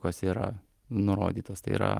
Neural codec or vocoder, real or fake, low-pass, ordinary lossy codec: none; real; 14.4 kHz; Opus, 32 kbps